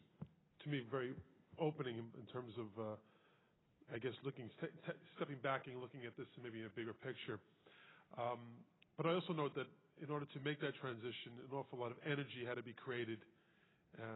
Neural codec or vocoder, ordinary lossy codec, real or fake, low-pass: none; AAC, 16 kbps; real; 7.2 kHz